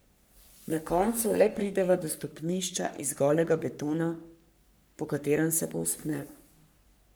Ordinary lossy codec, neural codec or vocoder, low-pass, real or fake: none; codec, 44.1 kHz, 3.4 kbps, Pupu-Codec; none; fake